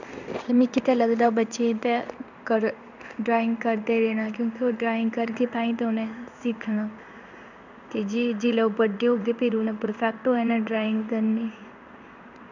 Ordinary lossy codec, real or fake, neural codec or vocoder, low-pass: none; fake; codec, 16 kHz in and 24 kHz out, 1 kbps, XY-Tokenizer; 7.2 kHz